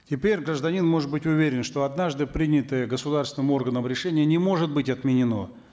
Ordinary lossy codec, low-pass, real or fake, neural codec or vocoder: none; none; real; none